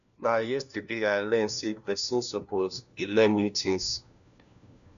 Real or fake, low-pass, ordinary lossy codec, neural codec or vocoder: fake; 7.2 kHz; none; codec, 16 kHz, 1 kbps, FunCodec, trained on LibriTTS, 50 frames a second